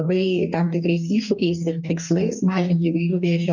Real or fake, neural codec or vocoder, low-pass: fake; codec, 44.1 kHz, 2.6 kbps, DAC; 7.2 kHz